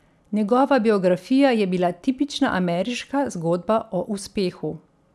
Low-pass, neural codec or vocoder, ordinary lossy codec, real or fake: none; none; none; real